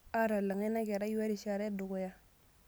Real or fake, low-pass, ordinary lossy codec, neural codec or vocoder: real; none; none; none